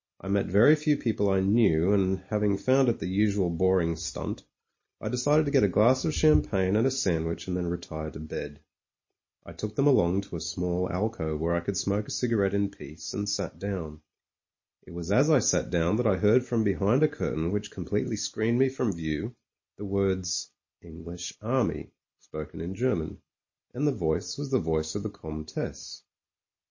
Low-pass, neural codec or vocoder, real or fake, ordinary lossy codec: 7.2 kHz; none; real; MP3, 32 kbps